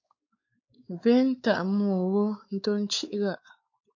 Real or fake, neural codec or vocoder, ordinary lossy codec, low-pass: fake; codec, 16 kHz, 4 kbps, X-Codec, WavLM features, trained on Multilingual LibriSpeech; AAC, 48 kbps; 7.2 kHz